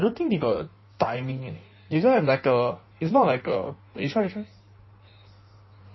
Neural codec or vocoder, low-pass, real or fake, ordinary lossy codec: codec, 16 kHz in and 24 kHz out, 1.1 kbps, FireRedTTS-2 codec; 7.2 kHz; fake; MP3, 24 kbps